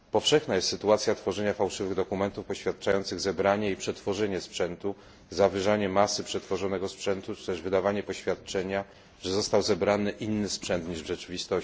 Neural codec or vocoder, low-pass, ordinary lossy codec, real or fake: none; none; none; real